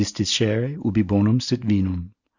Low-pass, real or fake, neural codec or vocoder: 7.2 kHz; real; none